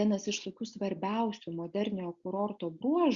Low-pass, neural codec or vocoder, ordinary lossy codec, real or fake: 7.2 kHz; none; Opus, 64 kbps; real